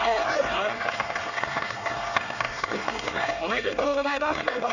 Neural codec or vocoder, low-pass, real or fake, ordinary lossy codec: codec, 24 kHz, 1 kbps, SNAC; 7.2 kHz; fake; none